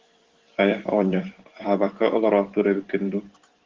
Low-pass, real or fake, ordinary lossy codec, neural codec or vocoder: 7.2 kHz; fake; Opus, 16 kbps; vocoder, 44.1 kHz, 128 mel bands every 512 samples, BigVGAN v2